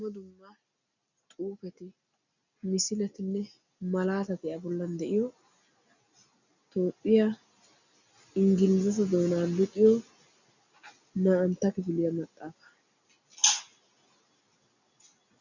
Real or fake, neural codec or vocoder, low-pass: real; none; 7.2 kHz